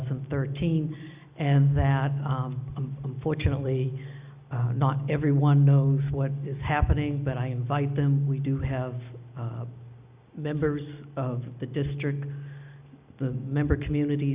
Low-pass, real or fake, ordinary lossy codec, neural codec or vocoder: 3.6 kHz; real; Opus, 64 kbps; none